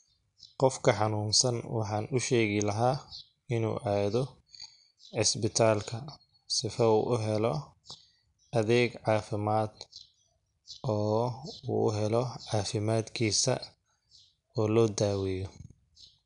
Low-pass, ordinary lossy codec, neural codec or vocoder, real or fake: 10.8 kHz; none; none; real